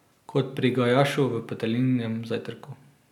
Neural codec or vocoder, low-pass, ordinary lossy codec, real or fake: none; 19.8 kHz; none; real